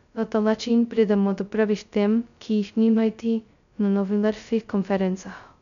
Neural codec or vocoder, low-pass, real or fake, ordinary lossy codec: codec, 16 kHz, 0.2 kbps, FocalCodec; 7.2 kHz; fake; none